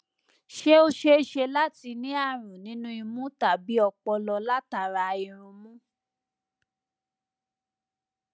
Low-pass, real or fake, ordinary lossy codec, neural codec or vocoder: none; real; none; none